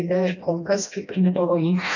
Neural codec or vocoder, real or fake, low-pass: codec, 16 kHz, 1 kbps, FreqCodec, smaller model; fake; 7.2 kHz